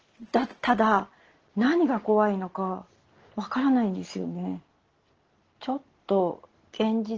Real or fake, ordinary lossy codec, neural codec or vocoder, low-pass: real; Opus, 16 kbps; none; 7.2 kHz